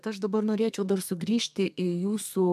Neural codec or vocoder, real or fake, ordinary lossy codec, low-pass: codec, 32 kHz, 1.9 kbps, SNAC; fake; MP3, 96 kbps; 14.4 kHz